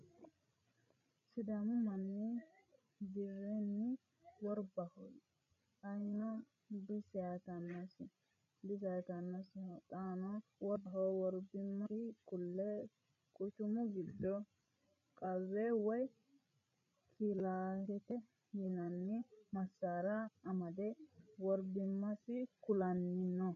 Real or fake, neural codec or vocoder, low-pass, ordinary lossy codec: fake; codec, 16 kHz, 16 kbps, FreqCodec, larger model; 7.2 kHz; AAC, 96 kbps